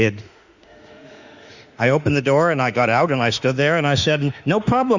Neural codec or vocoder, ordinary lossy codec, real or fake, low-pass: autoencoder, 48 kHz, 32 numbers a frame, DAC-VAE, trained on Japanese speech; Opus, 64 kbps; fake; 7.2 kHz